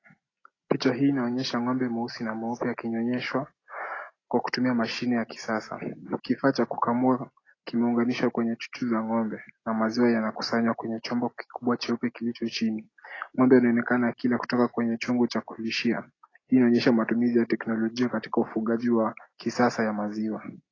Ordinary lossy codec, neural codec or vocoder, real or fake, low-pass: AAC, 32 kbps; none; real; 7.2 kHz